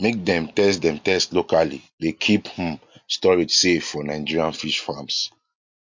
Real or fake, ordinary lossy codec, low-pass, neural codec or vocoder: real; MP3, 48 kbps; 7.2 kHz; none